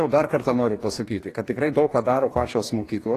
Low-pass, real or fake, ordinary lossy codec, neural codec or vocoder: 14.4 kHz; fake; AAC, 48 kbps; codec, 44.1 kHz, 2.6 kbps, DAC